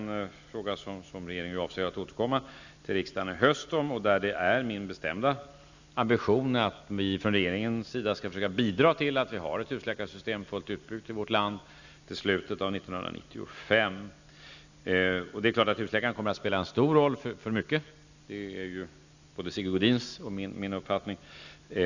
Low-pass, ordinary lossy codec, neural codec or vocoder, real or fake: 7.2 kHz; none; none; real